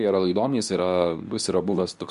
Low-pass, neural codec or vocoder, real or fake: 10.8 kHz; codec, 24 kHz, 0.9 kbps, WavTokenizer, medium speech release version 2; fake